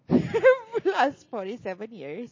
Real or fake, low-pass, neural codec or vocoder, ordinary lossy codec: real; 7.2 kHz; none; MP3, 32 kbps